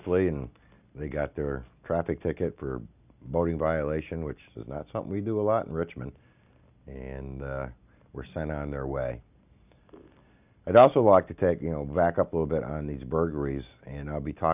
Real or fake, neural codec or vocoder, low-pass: real; none; 3.6 kHz